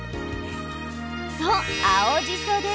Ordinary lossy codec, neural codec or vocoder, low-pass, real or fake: none; none; none; real